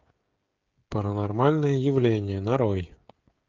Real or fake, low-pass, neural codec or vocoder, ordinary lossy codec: fake; 7.2 kHz; codec, 16 kHz, 16 kbps, FreqCodec, smaller model; Opus, 24 kbps